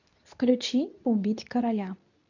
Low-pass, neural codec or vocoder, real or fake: 7.2 kHz; codec, 24 kHz, 0.9 kbps, WavTokenizer, medium speech release version 2; fake